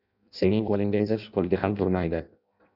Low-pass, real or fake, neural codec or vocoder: 5.4 kHz; fake; codec, 16 kHz in and 24 kHz out, 0.6 kbps, FireRedTTS-2 codec